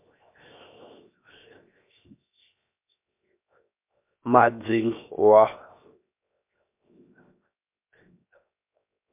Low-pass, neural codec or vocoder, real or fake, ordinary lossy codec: 3.6 kHz; codec, 16 kHz, 0.7 kbps, FocalCodec; fake; MP3, 32 kbps